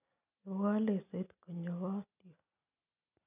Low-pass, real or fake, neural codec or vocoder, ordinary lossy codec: 3.6 kHz; real; none; none